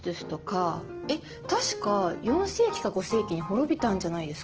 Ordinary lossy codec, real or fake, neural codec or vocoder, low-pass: Opus, 16 kbps; real; none; 7.2 kHz